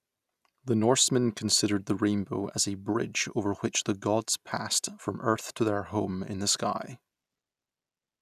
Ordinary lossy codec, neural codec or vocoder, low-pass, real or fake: none; none; 14.4 kHz; real